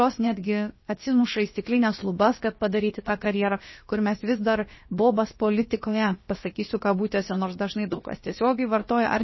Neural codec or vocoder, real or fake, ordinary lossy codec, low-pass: codec, 16 kHz, about 1 kbps, DyCAST, with the encoder's durations; fake; MP3, 24 kbps; 7.2 kHz